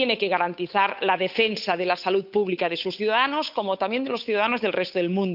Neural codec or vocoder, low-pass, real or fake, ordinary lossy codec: codec, 16 kHz, 8 kbps, FunCodec, trained on Chinese and English, 25 frames a second; 5.4 kHz; fake; none